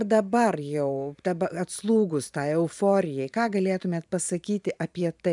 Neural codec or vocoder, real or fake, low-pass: vocoder, 44.1 kHz, 128 mel bands every 512 samples, BigVGAN v2; fake; 10.8 kHz